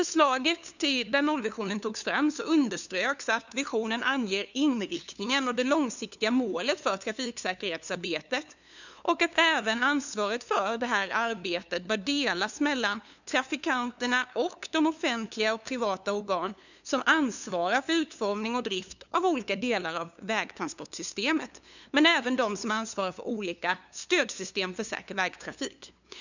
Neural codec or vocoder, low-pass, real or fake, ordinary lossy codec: codec, 16 kHz, 2 kbps, FunCodec, trained on LibriTTS, 25 frames a second; 7.2 kHz; fake; none